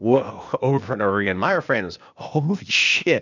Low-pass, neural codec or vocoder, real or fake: 7.2 kHz; codec, 16 kHz, 0.8 kbps, ZipCodec; fake